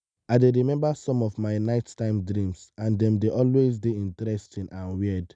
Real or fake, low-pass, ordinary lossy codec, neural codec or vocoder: real; 9.9 kHz; none; none